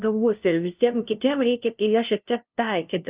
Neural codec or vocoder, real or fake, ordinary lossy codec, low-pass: codec, 16 kHz, 0.5 kbps, FunCodec, trained on LibriTTS, 25 frames a second; fake; Opus, 24 kbps; 3.6 kHz